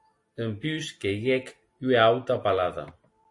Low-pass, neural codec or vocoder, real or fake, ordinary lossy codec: 10.8 kHz; none; real; AAC, 48 kbps